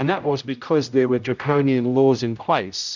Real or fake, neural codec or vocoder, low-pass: fake; codec, 16 kHz, 0.5 kbps, X-Codec, HuBERT features, trained on general audio; 7.2 kHz